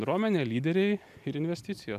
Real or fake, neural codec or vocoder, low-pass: real; none; 14.4 kHz